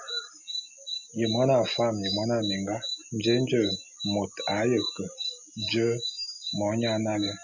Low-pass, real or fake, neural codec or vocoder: 7.2 kHz; real; none